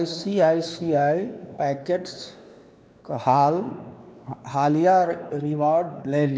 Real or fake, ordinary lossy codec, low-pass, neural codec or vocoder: fake; none; none; codec, 16 kHz, 2 kbps, X-Codec, WavLM features, trained on Multilingual LibriSpeech